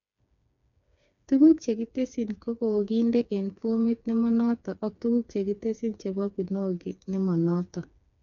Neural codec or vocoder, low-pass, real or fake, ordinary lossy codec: codec, 16 kHz, 4 kbps, FreqCodec, smaller model; 7.2 kHz; fake; none